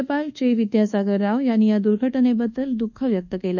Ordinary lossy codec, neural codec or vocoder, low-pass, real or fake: none; codec, 24 kHz, 1.2 kbps, DualCodec; 7.2 kHz; fake